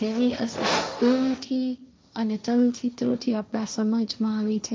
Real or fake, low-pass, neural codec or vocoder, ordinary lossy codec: fake; none; codec, 16 kHz, 1.1 kbps, Voila-Tokenizer; none